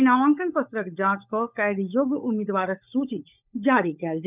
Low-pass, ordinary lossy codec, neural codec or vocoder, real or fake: 3.6 kHz; none; codec, 16 kHz, 16 kbps, FunCodec, trained on LibriTTS, 50 frames a second; fake